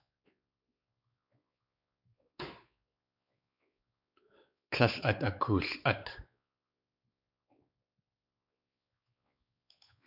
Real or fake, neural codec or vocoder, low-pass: fake; codec, 16 kHz, 4 kbps, X-Codec, WavLM features, trained on Multilingual LibriSpeech; 5.4 kHz